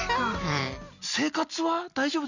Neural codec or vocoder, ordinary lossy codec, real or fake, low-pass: none; none; real; 7.2 kHz